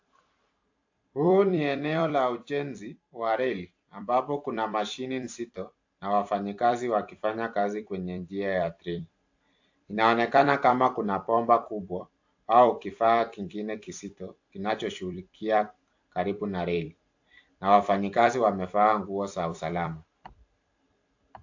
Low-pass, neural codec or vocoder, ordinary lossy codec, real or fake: 7.2 kHz; vocoder, 44.1 kHz, 128 mel bands every 256 samples, BigVGAN v2; AAC, 48 kbps; fake